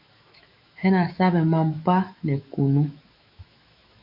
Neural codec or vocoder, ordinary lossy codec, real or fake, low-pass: autoencoder, 48 kHz, 128 numbers a frame, DAC-VAE, trained on Japanese speech; MP3, 48 kbps; fake; 5.4 kHz